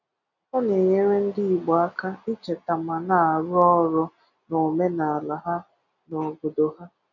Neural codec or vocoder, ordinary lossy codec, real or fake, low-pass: none; none; real; 7.2 kHz